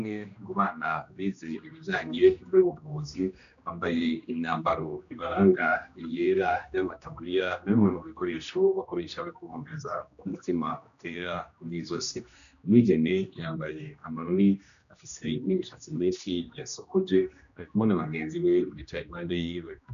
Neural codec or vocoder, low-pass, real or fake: codec, 16 kHz, 1 kbps, X-Codec, HuBERT features, trained on general audio; 7.2 kHz; fake